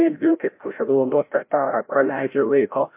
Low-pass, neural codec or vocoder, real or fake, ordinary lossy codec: 3.6 kHz; codec, 16 kHz, 0.5 kbps, FreqCodec, larger model; fake; MP3, 24 kbps